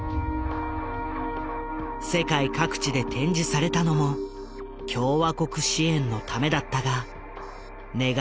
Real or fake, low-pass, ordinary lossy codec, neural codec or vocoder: real; none; none; none